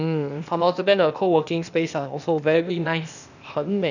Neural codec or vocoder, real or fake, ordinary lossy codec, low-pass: codec, 16 kHz, 0.8 kbps, ZipCodec; fake; none; 7.2 kHz